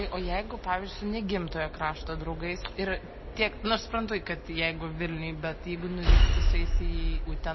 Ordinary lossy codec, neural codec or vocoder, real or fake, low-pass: MP3, 24 kbps; none; real; 7.2 kHz